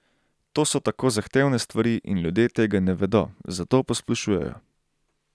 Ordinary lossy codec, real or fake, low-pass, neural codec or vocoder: none; real; none; none